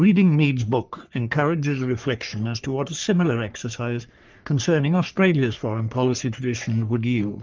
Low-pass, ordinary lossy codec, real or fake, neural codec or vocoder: 7.2 kHz; Opus, 24 kbps; fake; codec, 44.1 kHz, 3.4 kbps, Pupu-Codec